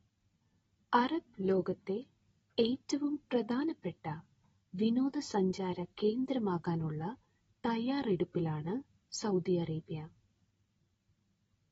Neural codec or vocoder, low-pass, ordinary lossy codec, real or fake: none; 10.8 kHz; AAC, 24 kbps; real